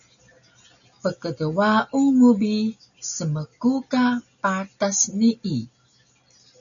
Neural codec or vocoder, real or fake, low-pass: none; real; 7.2 kHz